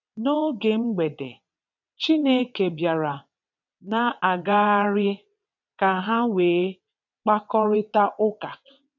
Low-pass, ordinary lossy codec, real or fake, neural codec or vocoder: 7.2 kHz; MP3, 64 kbps; fake; vocoder, 22.05 kHz, 80 mel bands, WaveNeXt